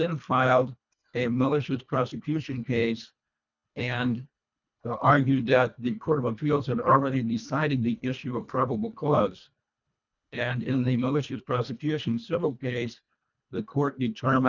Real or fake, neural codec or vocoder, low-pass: fake; codec, 24 kHz, 1.5 kbps, HILCodec; 7.2 kHz